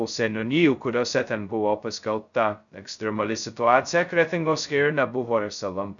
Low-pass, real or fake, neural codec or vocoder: 7.2 kHz; fake; codec, 16 kHz, 0.2 kbps, FocalCodec